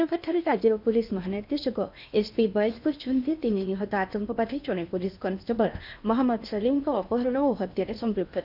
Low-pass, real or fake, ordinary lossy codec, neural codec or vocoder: 5.4 kHz; fake; none; codec, 16 kHz in and 24 kHz out, 0.8 kbps, FocalCodec, streaming, 65536 codes